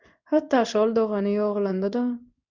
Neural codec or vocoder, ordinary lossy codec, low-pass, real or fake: codec, 16 kHz in and 24 kHz out, 1 kbps, XY-Tokenizer; Opus, 64 kbps; 7.2 kHz; fake